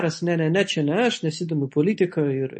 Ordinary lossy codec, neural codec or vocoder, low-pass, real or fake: MP3, 32 kbps; none; 9.9 kHz; real